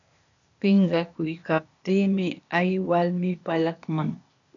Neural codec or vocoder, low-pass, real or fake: codec, 16 kHz, 0.8 kbps, ZipCodec; 7.2 kHz; fake